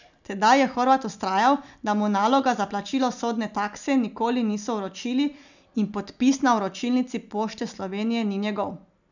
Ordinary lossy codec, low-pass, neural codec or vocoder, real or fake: none; 7.2 kHz; none; real